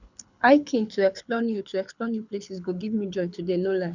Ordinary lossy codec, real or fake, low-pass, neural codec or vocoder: none; fake; 7.2 kHz; codec, 24 kHz, 6 kbps, HILCodec